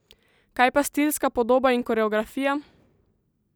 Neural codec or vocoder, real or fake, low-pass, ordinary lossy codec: none; real; none; none